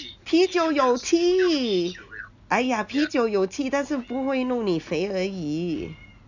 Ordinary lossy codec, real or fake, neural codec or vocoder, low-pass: none; real; none; 7.2 kHz